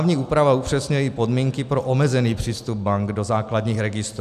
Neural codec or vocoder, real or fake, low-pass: none; real; 14.4 kHz